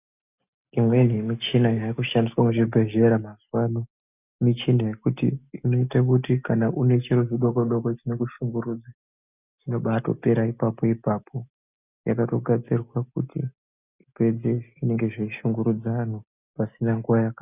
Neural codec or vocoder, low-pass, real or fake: none; 3.6 kHz; real